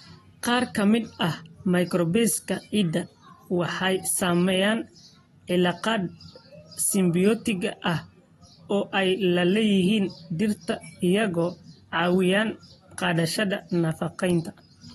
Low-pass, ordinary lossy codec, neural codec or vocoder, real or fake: 19.8 kHz; AAC, 32 kbps; none; real